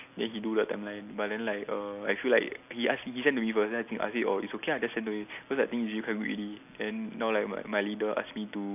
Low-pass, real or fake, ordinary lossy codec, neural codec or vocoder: 3.6 kHz; real; none; none